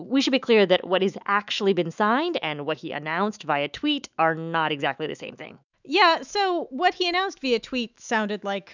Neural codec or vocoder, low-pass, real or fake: autoencoder, 48 kHz, 128 numbers a frame, DAC-VAE, trained on Japanese speech; 7.2 kHz; fake